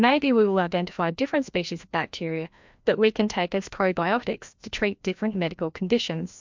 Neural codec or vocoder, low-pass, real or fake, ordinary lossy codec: codec, 16 kHz, 1 kbps, FreqCodec, larger model; 7.2 kHz; fake; MP3, 64 kbps